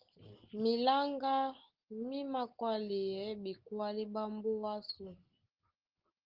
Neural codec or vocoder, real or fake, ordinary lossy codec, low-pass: none; real; Opus, 16 kbps; 5.4 kHz